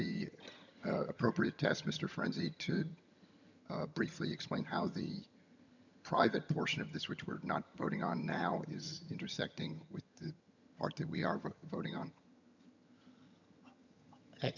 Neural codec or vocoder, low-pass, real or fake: vocoder, 22.05 kHz, 80 mel bands, HiFi-GAN; 7.2 kHz; fake